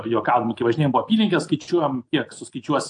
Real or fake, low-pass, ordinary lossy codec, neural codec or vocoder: real; 10.8 kHz; AAC, 64 kbps; none